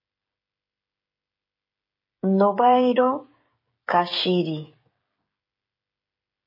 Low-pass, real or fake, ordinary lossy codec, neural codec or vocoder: 5.4 kHz; fake; MP3, 24 kbps; codec, 16 kHz, 16 kbps, FreqCodec, smaller model